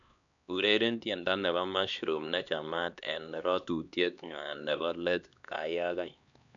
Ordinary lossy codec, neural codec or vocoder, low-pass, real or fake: none; codec, 16 kHz, 2 kbps, X-Codec, HuBERT features, trained on LibriSpeech; 7.2 kHz; fake